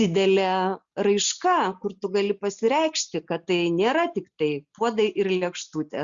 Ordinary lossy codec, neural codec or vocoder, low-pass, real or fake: Opus, 64 kbps; none; 7.2 kHz; real